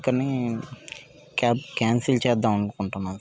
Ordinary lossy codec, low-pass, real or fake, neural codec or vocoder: none; none; real; none